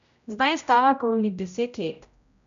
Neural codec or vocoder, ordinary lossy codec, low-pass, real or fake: codec, 16 kHz, 0.5 kbps, X-Codec, HuBERT features, trained on general audio; MP3, 64 kbps; 7.2 kHz; fake